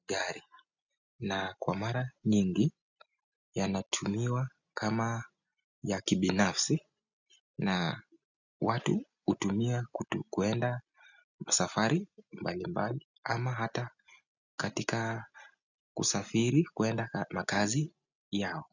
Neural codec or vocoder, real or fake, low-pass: none; real; 7.2 kHz